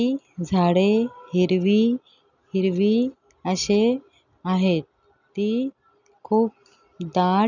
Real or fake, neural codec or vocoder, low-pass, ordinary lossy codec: real; none; 7.2 kHz; none